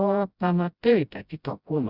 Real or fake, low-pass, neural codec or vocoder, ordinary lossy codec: fake; 5.4 kHz; codec, 16 kHz, 0.5 kbps, FreqCodec, smaller model; Opus, 64 kbps